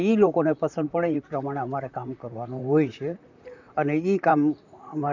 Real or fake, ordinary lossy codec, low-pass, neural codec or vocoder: fake; none; 7.2 kHz; vocoder, 44.1 kHz, 128 mel bands, Pupu-Vocoder